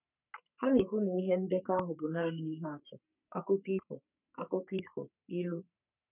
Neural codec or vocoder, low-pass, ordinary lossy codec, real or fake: codec, 44.1 kHz, 3.4 kbps, Pupu-Codec; 3.6 kHz; none; fake